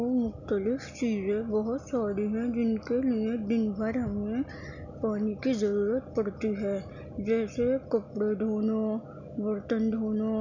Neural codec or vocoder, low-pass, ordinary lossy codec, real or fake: none; 7.2 kHz; none; real